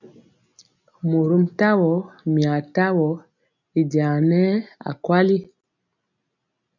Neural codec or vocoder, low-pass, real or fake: none; 7.2 kHz; real